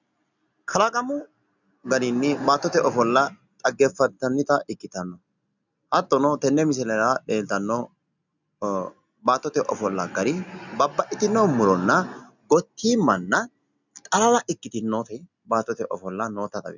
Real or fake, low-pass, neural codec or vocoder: real; 7.2 kHz; none